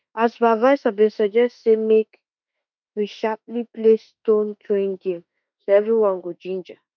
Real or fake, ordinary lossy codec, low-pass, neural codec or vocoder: fake; none; 7.2 kHz; autoencoder, 48 kHz, 32 numbers a frame, DAC-VAE, trained on Japanese speech